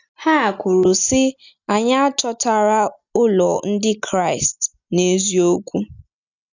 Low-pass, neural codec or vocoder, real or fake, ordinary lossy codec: 7.2 kHz; none; real; none